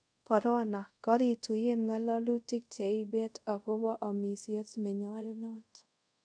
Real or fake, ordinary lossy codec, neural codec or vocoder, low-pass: fake; none; codec, 24 kHz, 0.5 kbps, DualCodec; 9.9 kHz